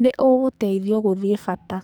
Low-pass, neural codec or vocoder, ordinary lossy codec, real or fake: none; codec, 44.1 kHz, 2.6 kbps, SNAC; none; fake